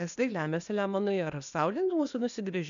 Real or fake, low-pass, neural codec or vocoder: fake; 7.2 kHz; codec, 16 kHz, 0.8 kbps, ZipCodec